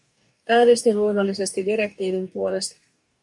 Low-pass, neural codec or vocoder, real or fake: 10.8 kHz; codec, 44.1 kHz, 2.6 kbps, DAC; fake